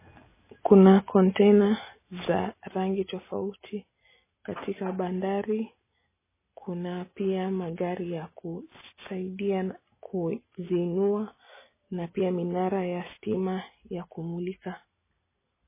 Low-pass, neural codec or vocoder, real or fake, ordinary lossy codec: 3.6 kHz; none; real; MP3, 16 kbps